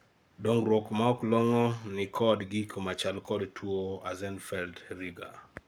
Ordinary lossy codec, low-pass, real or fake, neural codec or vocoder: none; none; fake; codec, 44.1 kHz, 7.8 kbps, Pupu-Codec